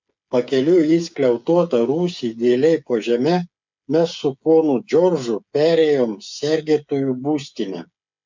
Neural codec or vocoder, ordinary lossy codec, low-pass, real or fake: codec, 16 kHz, 8 kbps, FreqCodec, smaller model; MP3, 64 kbps; 7.2 kHz; fake